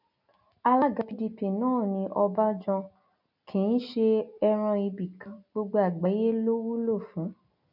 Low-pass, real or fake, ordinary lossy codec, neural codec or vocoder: 5.4 kHz; real; none; none